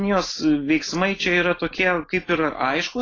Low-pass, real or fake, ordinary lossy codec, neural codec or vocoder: 7.2 kHz; real; AAC, 32 kbps; none